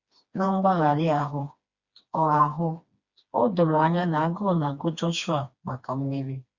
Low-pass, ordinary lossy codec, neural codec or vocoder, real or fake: 7.2 kHz; Opus, 64 kbps; codec, 16 kHz, 2 kbps, FreqCodec, smaller model; fake